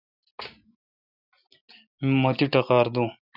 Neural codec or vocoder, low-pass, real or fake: none; 5.4 kHz; real